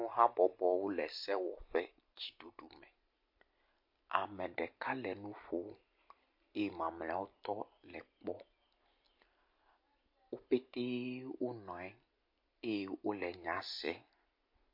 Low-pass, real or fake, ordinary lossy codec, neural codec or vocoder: 5.4 kHz; real; MP3, 32 kbps; none